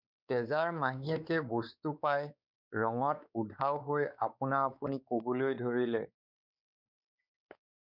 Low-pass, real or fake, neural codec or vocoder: 5.4 kHz; fake; codec, 16 kHz, 4 kbps, X-Codec, WavLM features, trained on Multilingual LibriSpeech